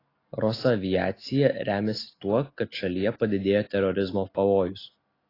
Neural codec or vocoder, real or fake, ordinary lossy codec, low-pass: none; real; AAC, 24 kbps; 5.4 kHz